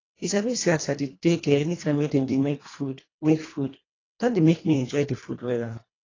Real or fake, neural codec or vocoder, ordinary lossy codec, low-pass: fake; codec, 24 kHz, 1.5 kbps, HILCodec; AAC, 32 kbps; 7.2 kHz